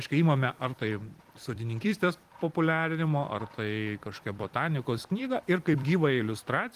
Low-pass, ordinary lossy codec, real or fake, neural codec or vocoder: 14.4 kHz; Opus, 24 kbps; fake; vocoder, 44.1 kHz, 128 mel bands every 256 samples, BigVGAN v2